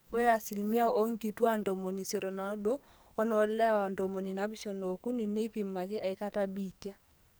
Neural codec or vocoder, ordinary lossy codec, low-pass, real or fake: codec, 44.1 kHz, 2.6 kbps, SNAC; none; none; fake